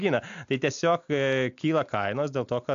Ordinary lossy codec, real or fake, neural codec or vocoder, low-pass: AAC, 64 kbps; real; none; 7.2 kHz